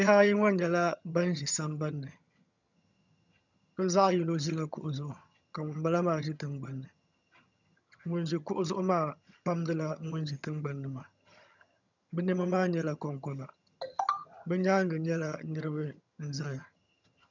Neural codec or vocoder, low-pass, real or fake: vocoder, 22.05 kHz, 80 mel bands, HiFi-GAN; 7.2 kHz; fake